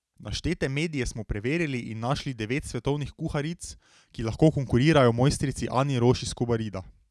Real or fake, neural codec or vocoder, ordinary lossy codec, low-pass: real; none; none; none